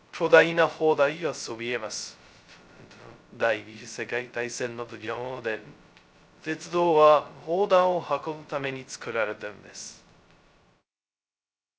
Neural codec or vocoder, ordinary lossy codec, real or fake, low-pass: codec, 16 kHz, 0.2 kbps, FocalCodec; none; fake; none